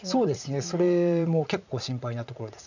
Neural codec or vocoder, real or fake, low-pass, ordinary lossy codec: vocoder, 44.1 kHz, 80 mel bands, Vocos; fake; 7.2 kHz; none